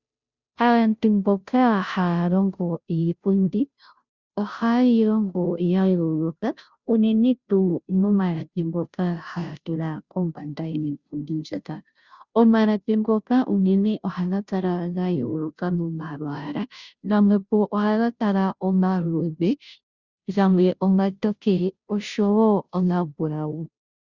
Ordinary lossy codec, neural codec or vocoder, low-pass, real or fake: Opus, 64 kbps; codec, 16 kHz, 0.5 kbps, FunCodec, trained on Chinese and English, 25 frames a second; 7.2 kHz; fake